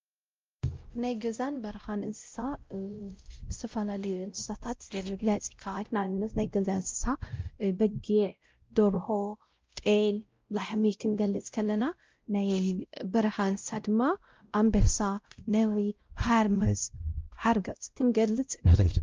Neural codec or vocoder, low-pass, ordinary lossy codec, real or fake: codec, 16 kHz, 0.5 kbps, X-Codec, WavLM features, trained on Multilingual LibriSpeech; 7.2 kHz; Opus, 24 kbps; fake